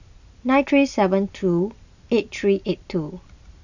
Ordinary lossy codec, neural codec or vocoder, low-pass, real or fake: none; none; 7.2 kHz; real